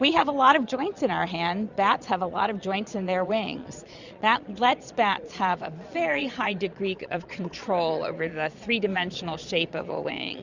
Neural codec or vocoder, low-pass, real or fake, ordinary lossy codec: vocoder, 22.05 kHz, 80 mel bands, Vocos; 7.2 kHz; fake; Opus, 64 kbps